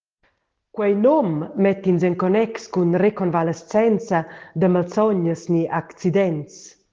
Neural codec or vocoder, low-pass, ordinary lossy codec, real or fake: none; 7.2 kHz; Opus, 16 kbps; real